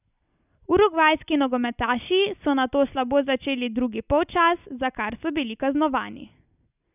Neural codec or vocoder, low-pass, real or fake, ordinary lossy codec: none; 3.6 kHz; real; none